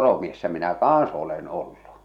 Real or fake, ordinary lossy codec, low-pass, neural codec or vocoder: real; none; 19.8 kHz; none